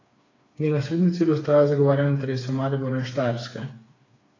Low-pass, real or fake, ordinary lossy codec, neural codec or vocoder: 7.2 kHz; fake; AAC, 32 kbps; codec, 16 kHz, 4 kbps, FreqCodec, smaller model